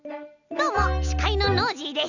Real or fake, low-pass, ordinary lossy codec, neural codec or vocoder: real; 7.2 kHz; none; none